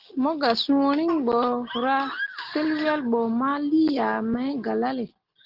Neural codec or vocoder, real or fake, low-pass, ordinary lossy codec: none; real; 5.4 kHz; Opus, 16 kbps